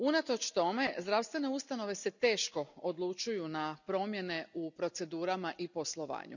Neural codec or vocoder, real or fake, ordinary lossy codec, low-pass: none; real; none; 7.2 kHz